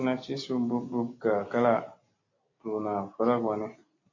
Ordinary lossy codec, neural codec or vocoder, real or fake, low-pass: AAC, 32 kbps; none; real; 7.2 kHz